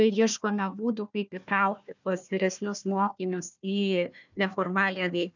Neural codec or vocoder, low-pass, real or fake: codec, 16 kHz, 1 kbps, FunCodec, trained on Chinese and English, 50 frames a second; 7.2 kHz; fake